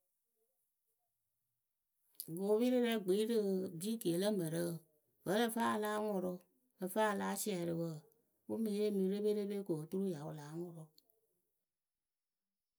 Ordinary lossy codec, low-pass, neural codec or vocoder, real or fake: none; none; none; real